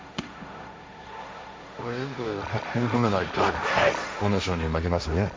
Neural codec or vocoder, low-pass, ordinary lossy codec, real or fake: codec, 16 kHz, 1.1 kbps, Voila-Tokenizer; none; none; fake